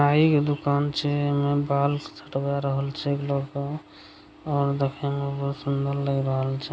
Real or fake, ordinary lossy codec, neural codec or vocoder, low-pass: real; none; none; none